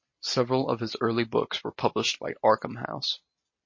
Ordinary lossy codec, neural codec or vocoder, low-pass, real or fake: MP3, 32 kbps; none; 7.2 kHz; real